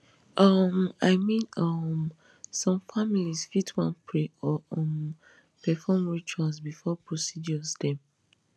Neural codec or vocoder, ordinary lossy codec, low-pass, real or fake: none; none; none; real